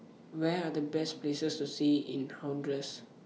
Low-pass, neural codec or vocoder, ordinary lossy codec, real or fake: none; none; none; real